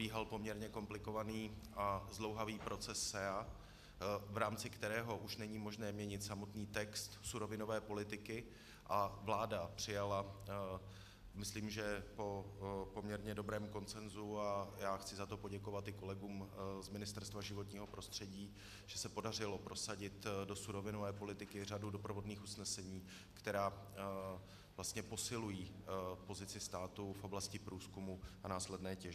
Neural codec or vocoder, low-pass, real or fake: none; 14.4 kHz; real